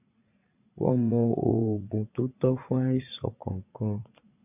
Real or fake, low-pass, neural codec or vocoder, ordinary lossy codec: fake; 3.6 kHz; vocoder, 44.1 kHz, 80 mel bands, Vocos; AAC, 32 kbps